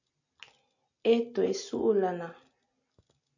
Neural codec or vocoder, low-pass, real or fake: none; 7.2 kHz; real